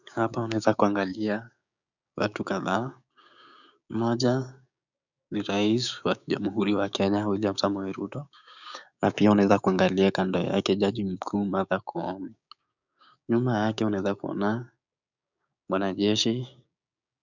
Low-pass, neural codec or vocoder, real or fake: 7.2 kHz; codec, 16 kHz, 6 kbps, DAC; fake